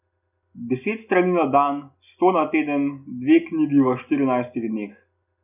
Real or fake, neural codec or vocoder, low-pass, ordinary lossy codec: real; none; 3.6 kHz; none